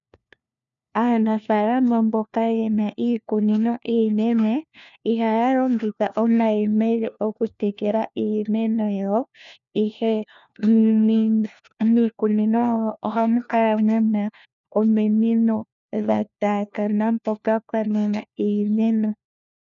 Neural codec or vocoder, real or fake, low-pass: codec, 16 kHz, 1 kbps, FunCodec, trained on LibriTTS, 50 frames a second; fake; 7.2 kHz